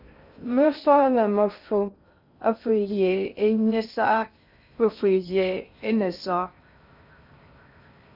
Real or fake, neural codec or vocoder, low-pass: fake; codec, 16 kHz in and 24 kHz out, 0.6 kbps, FocalCodec, streaming, 2048 codes; 5.4 kHz